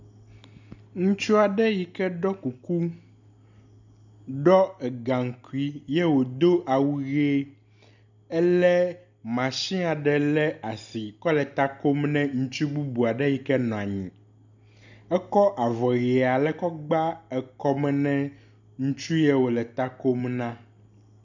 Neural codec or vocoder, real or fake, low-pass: none; real; 7.2 kHz